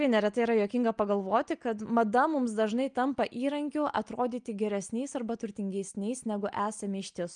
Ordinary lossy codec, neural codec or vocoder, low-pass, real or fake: Opus, 32 kbps; none; 9.9 kHz; real